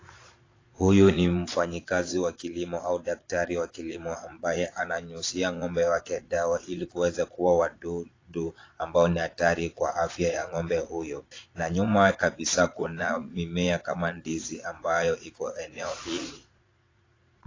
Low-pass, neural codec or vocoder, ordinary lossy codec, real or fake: 7.2 kHz; vocoder, 22.05 kHz, 80 mel bands, Vocos; AAC, 32 kbps; fake